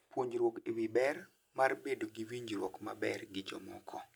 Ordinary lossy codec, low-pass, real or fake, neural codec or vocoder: none; none; real; none